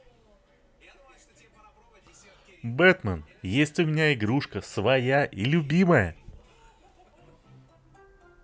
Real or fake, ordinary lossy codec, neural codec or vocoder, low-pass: real; none; none; none